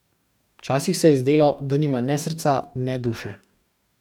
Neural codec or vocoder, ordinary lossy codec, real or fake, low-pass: codec, 44.1 kHz, 2.6 kbps, DAC; none; fake; 19.8 kHz